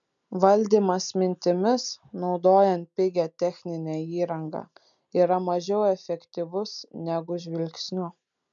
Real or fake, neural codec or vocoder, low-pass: real; none; 7.2 kHz